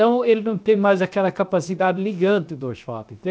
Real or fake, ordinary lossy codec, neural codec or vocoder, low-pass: fake; none; codec, 16 kHz, 0.7 kbps, FocalCodec; none